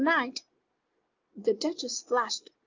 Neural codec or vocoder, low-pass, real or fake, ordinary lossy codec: none; 7.2 kHz; real; Opus, 24 kbps